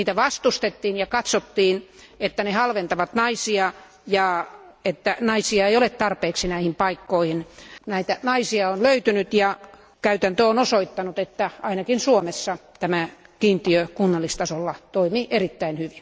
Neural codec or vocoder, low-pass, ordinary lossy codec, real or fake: none; none; none; real